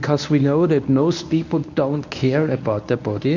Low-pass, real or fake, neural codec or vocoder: 7.2 kHz; fake; codec, 24 kHz, 0.9 kbps, WavTokenizer, medium speech release version 1